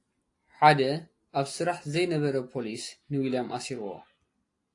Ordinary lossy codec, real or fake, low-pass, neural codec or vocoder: AAC, 48 kbps; real; 10.8 kHz; none